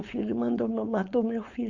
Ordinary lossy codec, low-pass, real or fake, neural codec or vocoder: none; 7.2 kHz; fake; codec, 16 kHz, 4.8 kbps, FACodec